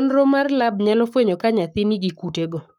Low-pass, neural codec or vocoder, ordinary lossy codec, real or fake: 19.8 kHz; codec, 44.1 kHz, 7.8 kbps, Pupu-Codec; none; fake